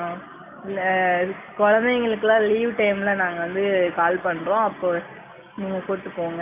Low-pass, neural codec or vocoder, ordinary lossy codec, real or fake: 3.6 kHz; none; none; real